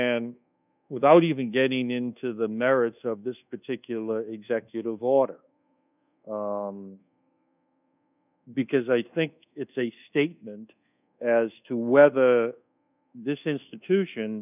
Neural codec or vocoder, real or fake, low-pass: codec, 24 kHz, 1.2 kbps, DualCodec; fake; 3.6 kHz